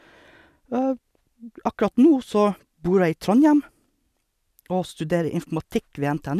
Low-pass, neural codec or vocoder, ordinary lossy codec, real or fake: 14.4 kHz; none; none; real